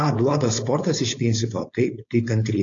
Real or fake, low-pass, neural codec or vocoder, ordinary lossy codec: fake; 7.2 kHz; codec, 16 kHz, 4.8 kbps, FACodec; AAC, 48 kbps